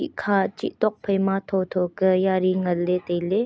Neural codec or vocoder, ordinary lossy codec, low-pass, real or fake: none; none; none; real